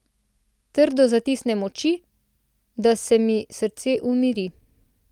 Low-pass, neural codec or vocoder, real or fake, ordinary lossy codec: 19.8 kHz; none; real; Opus, 32 kbps